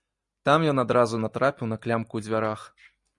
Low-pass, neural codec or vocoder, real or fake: 10.8 kHz; none; real